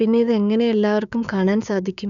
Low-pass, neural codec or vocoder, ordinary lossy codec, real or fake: 7.2 kHz; codec, 16 kHz, 6 kbps, DAC; none; fake